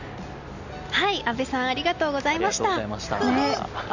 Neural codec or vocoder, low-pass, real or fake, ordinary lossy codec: none; 7.2 kHz; real; none